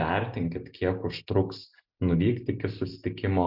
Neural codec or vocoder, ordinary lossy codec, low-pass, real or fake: none; Opus, 64 kbps; 5.4 kHz; real